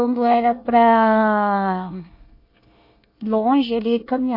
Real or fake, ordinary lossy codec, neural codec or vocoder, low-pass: fake; MP3, 32 kbps; codec, 24 kHz, 1 kbps, SNAC; 5.4 kHz